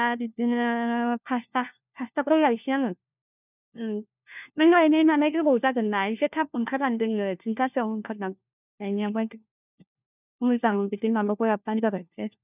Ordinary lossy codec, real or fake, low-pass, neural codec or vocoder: none; fake; 3.6 kHz; codec, 16 kHz, 1 kbps, FunCodec, trained on LibriTTS, 50 frames a second